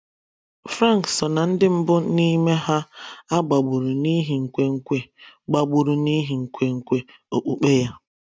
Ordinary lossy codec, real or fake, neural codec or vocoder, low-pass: none; real; none; none